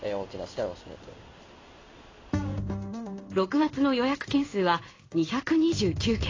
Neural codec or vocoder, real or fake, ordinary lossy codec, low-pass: codec, 16 kHz in and 24 kHz out, 1 kbps, XY-Tokenizer; fake; AAC, 32 kbps; 7.2 kHz